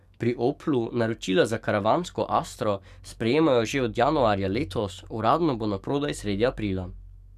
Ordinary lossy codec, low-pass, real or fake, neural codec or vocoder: none; 14.4 kHz; fake; codec, 44.1 kHz, 7.8 kbps, DAC